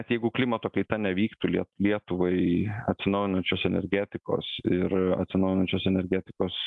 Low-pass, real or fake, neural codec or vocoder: 10.8 kHz; real; none